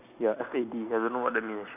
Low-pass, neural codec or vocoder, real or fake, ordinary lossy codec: 3.6 kHz; none; real; none